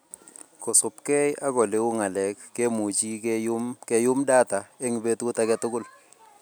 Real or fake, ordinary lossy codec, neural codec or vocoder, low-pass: real; none; none; none